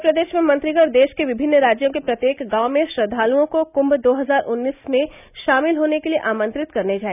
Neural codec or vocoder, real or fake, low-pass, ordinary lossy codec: none; real; 3.6 kHz; none